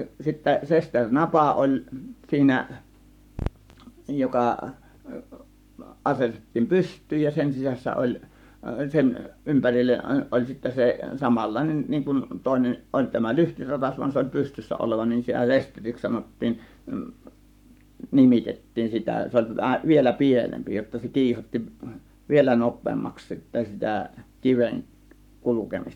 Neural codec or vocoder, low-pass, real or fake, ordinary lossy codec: codec, 44.1 kHz, 7.8 kbps, Pupu-Codec; 19.8 kHz; fake; none